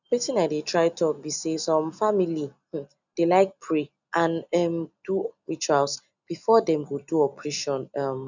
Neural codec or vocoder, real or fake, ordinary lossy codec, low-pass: none; real; none; 7.2 kHz